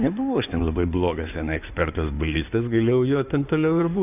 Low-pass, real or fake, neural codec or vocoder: 3.6 kHz; fake; codec, 16 kHz in and 24 kHz out, 2.2 kbps, FireRedTTS-2 codec